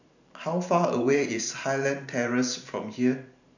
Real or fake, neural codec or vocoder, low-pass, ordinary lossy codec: fake; vocoder, 44.1 kHz, 128 mel bands every 512 samples, BigVGAN v2; 7.2 kHz; none